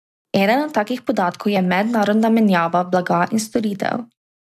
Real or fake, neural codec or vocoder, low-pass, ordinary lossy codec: real; none; 14.4 kHz; none